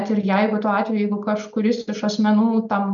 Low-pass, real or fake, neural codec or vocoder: 7.2 kHz; real; none